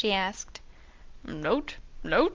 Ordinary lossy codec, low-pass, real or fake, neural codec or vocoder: Opus, 24 kbps; 7.2 kHz; real; none